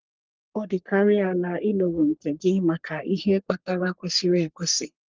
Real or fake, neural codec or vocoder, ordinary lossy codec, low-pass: fake; codec, 44.1 kHz, 3.4 kbps, Pupu-Codec; Opus, 32 kbps; 7.2 kHz